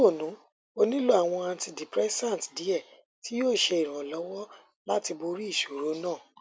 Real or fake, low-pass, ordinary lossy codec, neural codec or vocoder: real; none; none; none